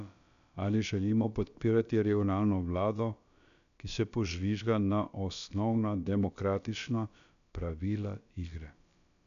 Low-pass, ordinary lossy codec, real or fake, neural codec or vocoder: 7.2 kHz; none; fake; codec, 16 kHz, about 1 kbps, DyCAST, with the encoder's durations